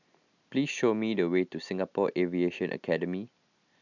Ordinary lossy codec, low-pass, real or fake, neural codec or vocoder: none; 7.2 kHz; real; none